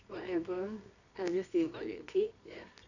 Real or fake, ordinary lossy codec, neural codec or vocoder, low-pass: fake; none; codec, 24 kHz, 0.9 kbps, WavTokenizer, medium music audio release; 7.2 kHz